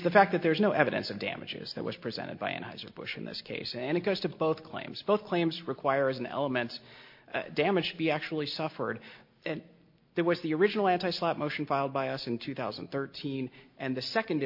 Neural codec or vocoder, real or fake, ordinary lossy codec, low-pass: none; real; MP3, 32 kbps; 5.4 kHz